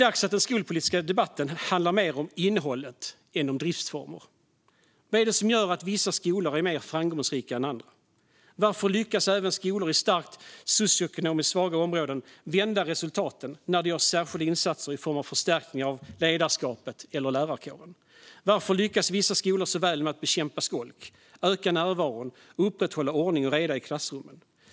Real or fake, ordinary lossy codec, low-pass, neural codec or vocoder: real; none; none; none